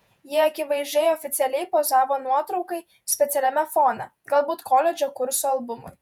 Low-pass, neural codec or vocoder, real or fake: 19.8 kHz; vocoder, 48 kHz, 128 mel bands, Vocos; fake